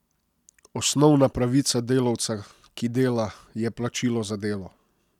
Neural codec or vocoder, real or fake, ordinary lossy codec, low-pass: none; real; none; 19.8 kHz